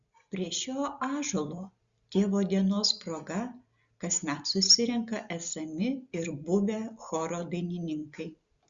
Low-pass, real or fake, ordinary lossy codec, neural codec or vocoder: 7.2 kHz; real; Opus, 64 kbps; none